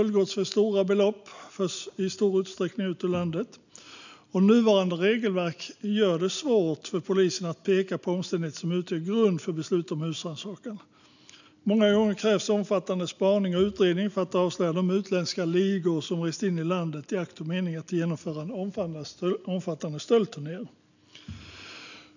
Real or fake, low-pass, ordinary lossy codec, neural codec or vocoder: real; 7.2 kHz; none; none